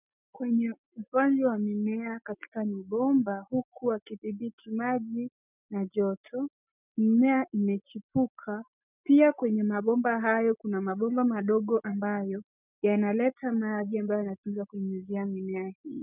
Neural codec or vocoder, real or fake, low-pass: none; real; 3.6 kHz